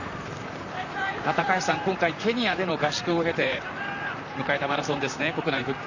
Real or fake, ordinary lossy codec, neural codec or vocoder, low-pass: fake; none; vocoder, 44.1 kHz, 128 mel bands, Pupu-Vocoder; 7.2 kHz